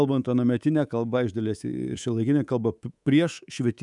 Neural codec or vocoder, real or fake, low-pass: codec, 24 kHz, 3.1 kbps, DualCodec; fake; 10.8 kHz